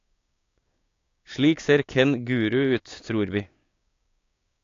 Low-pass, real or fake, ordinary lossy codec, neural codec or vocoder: 7.2 kHz; fake; AAC, 48 kbps; codec, 16 kHz, 6 kbps, DAC